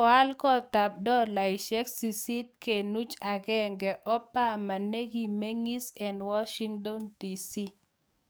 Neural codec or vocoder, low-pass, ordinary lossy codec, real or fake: codec, 44.1 kHz, 7.8 kbps, DAC; none; none; fake